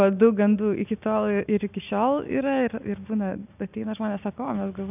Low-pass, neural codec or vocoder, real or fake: 3.6 kHz; none; real